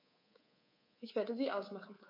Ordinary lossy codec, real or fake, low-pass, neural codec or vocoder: MP3, 32 kbps; fake; 5.4 kHz; codec, 24 kHz, 3.1 kbps, DualCodec